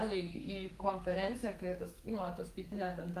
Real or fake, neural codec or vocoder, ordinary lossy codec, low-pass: fake; codec, 32 kHz, 1.9 kbps, SNAC; Opus, 32 kbps; 14.4 kHz